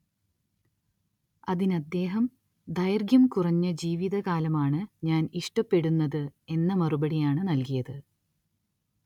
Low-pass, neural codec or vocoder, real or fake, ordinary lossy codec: 19.8 kHz; none; real; none